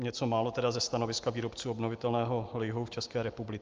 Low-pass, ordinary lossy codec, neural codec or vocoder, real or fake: 7.2 kHz; Opus, 24 kbps; none; real